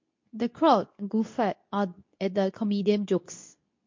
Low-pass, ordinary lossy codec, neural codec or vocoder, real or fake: 7.2 kHz; MP3, 48 kbps; codec, 24 kHz, 0.9 kbps, WavTokenizer, medium speech release version 2; fake